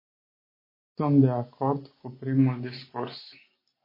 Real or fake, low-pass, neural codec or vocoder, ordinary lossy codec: real; 5.4 kHz; none; MP3, 24 kbps